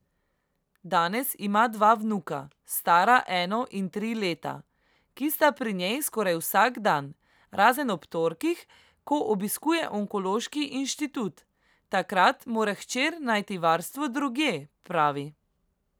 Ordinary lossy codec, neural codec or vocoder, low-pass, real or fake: none; none; none; real